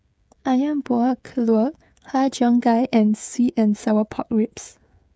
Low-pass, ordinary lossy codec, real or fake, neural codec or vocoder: none; none; fake; codec, 16 kHz, 16 kbps, FreqCodec, smaller model